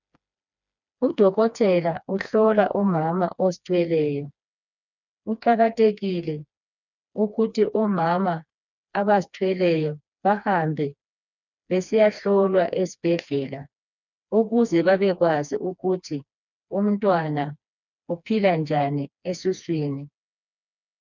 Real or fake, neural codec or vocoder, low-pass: fake; codec, 16 kHz, 2 kbps, FreqCodec, smaller model; 7.2 kHz